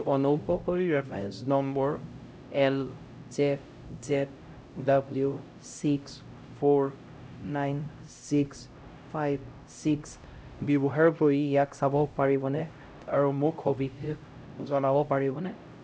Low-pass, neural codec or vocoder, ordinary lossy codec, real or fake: none; codec, 16 kHz, 0.5 kbps, X-Codec, HuBERT features, trained on LibriSpeech; none; fake